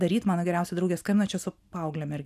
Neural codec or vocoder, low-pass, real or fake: none; 14.4 kHz; real